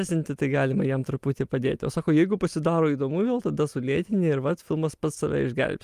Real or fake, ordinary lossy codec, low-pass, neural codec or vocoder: real; Opus, 32 kbps; 14.4 kHz; none